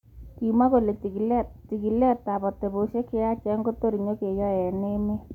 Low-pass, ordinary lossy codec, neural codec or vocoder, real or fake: 19.8 kHz; none; none; real